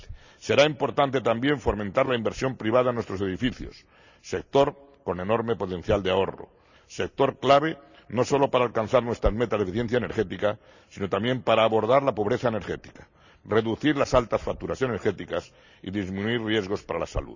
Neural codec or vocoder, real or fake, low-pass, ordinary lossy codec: none; real; 7.2 kHz; none